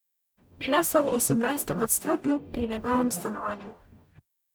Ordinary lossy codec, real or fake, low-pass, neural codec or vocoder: none; fake; none; codec, 44.1 kHz, 0.9 kbps, DAC